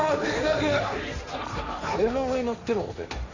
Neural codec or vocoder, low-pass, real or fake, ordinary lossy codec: codec, 16 kHz, 1.1 kbps, Voila-Tokenizer; 7.2 kHz; fake; none